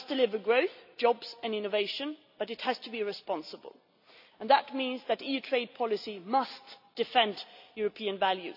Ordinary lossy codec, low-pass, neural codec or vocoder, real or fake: AAC, 48 kbps; 5.4 kHz; none; real